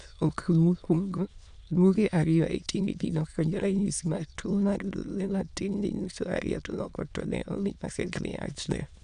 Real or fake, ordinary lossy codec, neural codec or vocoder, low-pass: fake; none; autoencoder, 22.05 kHz, a latent of 192 numbers a frame, VITS, trained on many speakers; 9.9 kHz